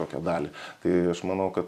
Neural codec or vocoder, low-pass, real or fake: vocoder, 44.1 kHz, 128 mel bands every 256 samples, BigVGAN v2; 14.4 kHz; fake